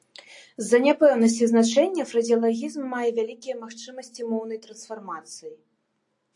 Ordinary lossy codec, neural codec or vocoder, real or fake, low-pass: AAC, 64 kbps; none; real; 10.8 kHz